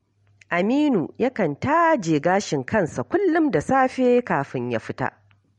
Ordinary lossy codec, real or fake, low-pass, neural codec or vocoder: MP3, 48 kbps; real; 19.8 kHz; none